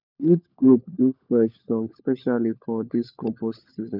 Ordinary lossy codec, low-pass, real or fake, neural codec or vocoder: none; 5.4 kHz; fake; codec, 16 kHz, 16 kbps, FunCodec, trained on LibriTTS, 50 frames a second